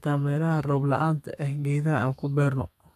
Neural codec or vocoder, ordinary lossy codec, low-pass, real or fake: codec, 32 kHz, 1.9 kbps, SNAC; none; 14.4 kHz; fake